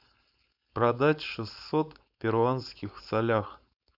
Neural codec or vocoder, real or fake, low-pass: codec, 16 kHz, 4.8 kbps, FACodec; fake; 5.4 kHz